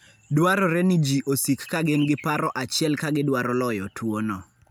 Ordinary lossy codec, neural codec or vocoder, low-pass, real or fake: none; none; none; real